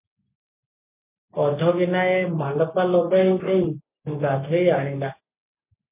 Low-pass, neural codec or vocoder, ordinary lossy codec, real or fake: 3.6 kHz; none; MP3, 24 kbps; real